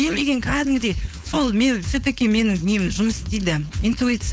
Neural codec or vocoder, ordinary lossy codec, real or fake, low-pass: codec, 16 kHz, 4.8 kbps, FACodec; none; fake; none